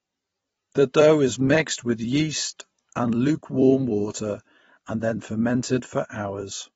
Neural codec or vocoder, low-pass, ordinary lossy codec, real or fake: vocoder, 44.1 kHz, 128 mel bands every 256 samples, BigVGAN v2; 19.8 kHz; AAC, 24 kbps; fake